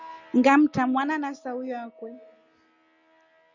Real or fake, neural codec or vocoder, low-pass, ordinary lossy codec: real; none; 7.2 kHz; Opus, 64 kbps